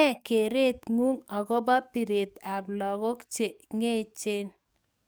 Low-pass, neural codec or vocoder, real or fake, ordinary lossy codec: none; codec, 44.1 kHz, 7.8 kbps, DAC; fake; none